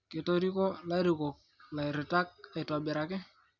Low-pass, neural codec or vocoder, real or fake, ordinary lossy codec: 7.2 kHz; none; real; Opus, 64 kbps